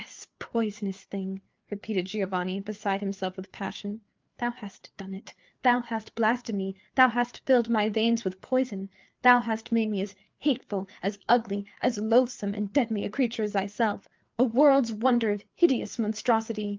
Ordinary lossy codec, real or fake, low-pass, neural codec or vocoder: Opus, 24 kbps; fake; 7.2 kHz; codec, 16 kHz, 4 kbps, FreqCodec, larger model